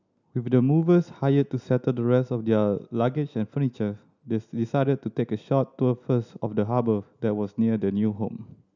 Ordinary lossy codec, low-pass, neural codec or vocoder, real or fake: none; 7.2 kHz; none; real